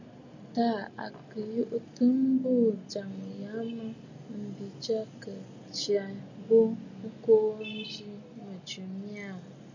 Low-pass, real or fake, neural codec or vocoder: 7.2 kHz; real; none